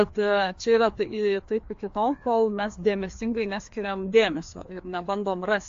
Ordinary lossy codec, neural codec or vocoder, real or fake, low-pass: AAC, 48 kbps; codec, 16 kHz, 2 kbps, FreqCodec, larger model; fake; 7.2 kHz